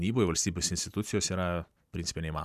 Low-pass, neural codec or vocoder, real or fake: 14.4 kHz; vocoder, 44.1 kHz, 128 mel bands every 256 samples, BigVGAN v2; fake